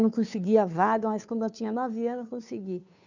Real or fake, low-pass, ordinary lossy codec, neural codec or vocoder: fake; 7.2 kHz; none; codec, 16 kHz, 2 kbps, FunCodec, trained on Chinese and English, 25 frames a second